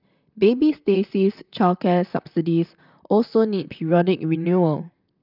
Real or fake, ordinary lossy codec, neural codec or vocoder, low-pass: fake; none; vocoder, 44.1 kHz, 128 mel bands, Pupu-Vocoder; 5.4 kHz